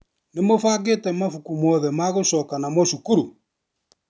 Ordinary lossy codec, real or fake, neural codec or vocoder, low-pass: none; real; none; none